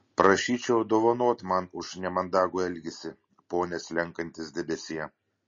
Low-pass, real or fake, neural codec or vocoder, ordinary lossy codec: 7.2 kHz; real; none; MP3, 32 kbps